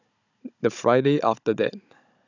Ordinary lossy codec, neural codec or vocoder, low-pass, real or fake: none; codec, 16 kHz, 16 kbps, FunCodec, trained on Chinese and English, 50 frames a second; 7.2 kHz; fake